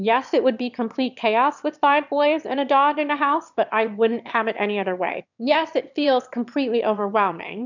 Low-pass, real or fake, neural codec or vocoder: 7.2 kHz; fake; autoencoder, 22.05 kHz, a latent of 192 numbers a frame, VITS, trained on one speaker